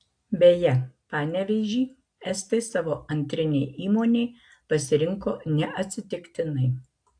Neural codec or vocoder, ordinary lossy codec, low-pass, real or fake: none; AAC, 64 kbps; 9.9 kHz; real